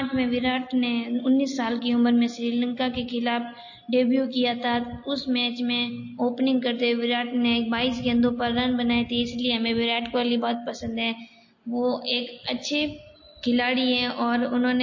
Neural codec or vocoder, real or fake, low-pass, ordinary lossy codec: none; real; 7.2 kHz; MP3, 32 kbps